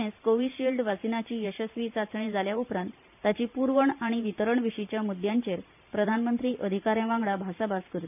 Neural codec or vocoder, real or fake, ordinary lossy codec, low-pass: vocoder, 44.1 kHz, 128 mel bands every 512 samples, BigVGAN v2; fake; none; 3.6 kHz